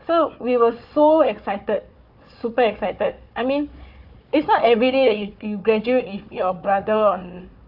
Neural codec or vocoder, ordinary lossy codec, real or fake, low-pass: codec, 16 kHz, 4 kbps, FunCodec, trained on Chinese and English, 50 frames a second; none; fake; 5.4 kHz